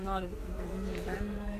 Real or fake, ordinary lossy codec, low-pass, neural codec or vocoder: fake; AAC, 64 kbps; 14.4 kHz; codec, 44.1 kHz, 2.6 kbps, SNAC